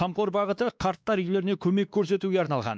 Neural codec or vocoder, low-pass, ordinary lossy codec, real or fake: codec, 16 kHz, 2 kbps, X-Codec, WavLM features, trained on Multilingual LibriSpeech; none; none; fake